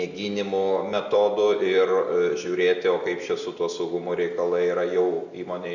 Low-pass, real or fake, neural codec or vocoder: 7.2 kHz; real; none